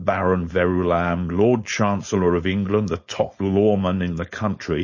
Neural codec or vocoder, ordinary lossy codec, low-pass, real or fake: codec, 16 kHz, 4.8 kbps, FACodec; MP3, 32 kbps; 7.2 kHz; fake